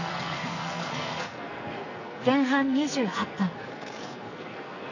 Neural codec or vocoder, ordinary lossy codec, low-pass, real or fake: codec, 44.1 kHz, 2.6 kbps, SNAC; none; 7.2 kHz; fake